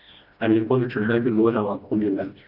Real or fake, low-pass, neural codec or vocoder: fake; 5.4 kHz; codec, 16 kHz, 1 kbps, FreqCodec, smaller model